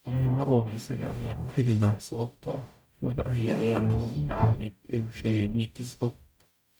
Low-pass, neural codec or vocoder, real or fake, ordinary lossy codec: none; codec, 44.1 kHz, 0.9 kbps, DAC; fake; none